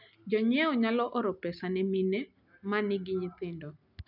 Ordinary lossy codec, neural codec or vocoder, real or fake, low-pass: none; none; real; 5.4 kHz